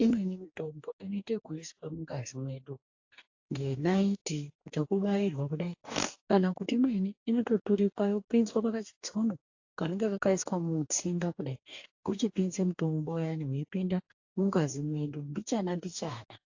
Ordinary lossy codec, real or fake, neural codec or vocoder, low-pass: AAC, 48 kbps; fake; codec, 44.1 kHz, 2.6 kbps, DAC; 7.2 kHz